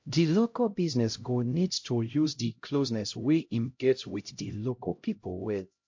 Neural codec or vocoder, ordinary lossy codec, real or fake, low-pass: codec, 16 kHz, 0.5 kbps, X-Codec, HuBERT features, trained on LibriSpeech; MP3, 48 kbps; fake; 7.2 kHz